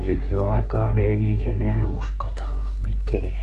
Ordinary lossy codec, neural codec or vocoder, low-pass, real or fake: none; codec, 24 kHz, 1 kbps, SNAC; 10.8 kHz; fake